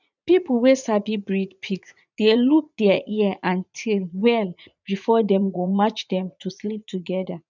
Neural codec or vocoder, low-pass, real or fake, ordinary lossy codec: vocoder, 44.1 kHz, 80 mel bands, Vocos; 7.2 kHz; fake; none